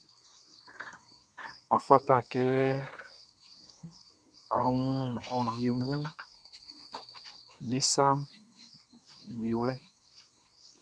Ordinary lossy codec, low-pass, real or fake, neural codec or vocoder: MP3, 96 kbps; 9.9 kHz; fake; codec, 24 kHz, 1 kbps, SNAC